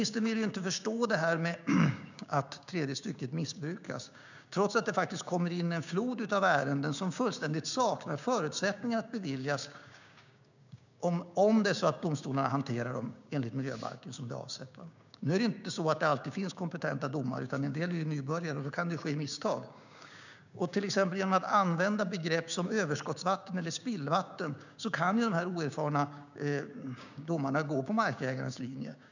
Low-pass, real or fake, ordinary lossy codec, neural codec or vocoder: 7.2 kHz; fake; none; codec, 16 kHz, 6 kbps, DAC